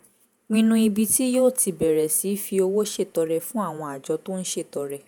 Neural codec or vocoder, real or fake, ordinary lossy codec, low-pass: vocoder, 48 kHz, 128 mel bands, Vocos; fake; none; none